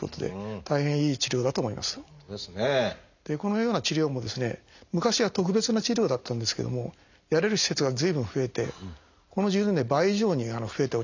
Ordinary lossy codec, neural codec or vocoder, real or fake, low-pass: none; none; real; 7.2 kHz